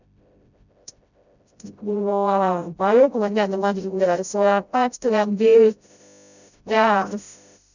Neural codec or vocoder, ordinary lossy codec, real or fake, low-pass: codec, 16 kHz, 0.5 kbps, FreqCodec, smaller model; none; fake; 7.2 kHz